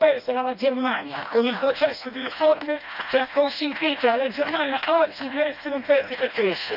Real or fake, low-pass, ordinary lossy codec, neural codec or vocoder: fake; 5.4 kHz; none; codec, 16 kHz, 1 kbps, FreqCodec, smaller model